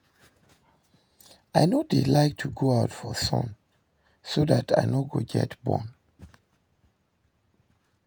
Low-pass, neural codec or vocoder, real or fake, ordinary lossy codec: none; vocoder, 48 kHz, 128 mel bands, Vocos; fake; none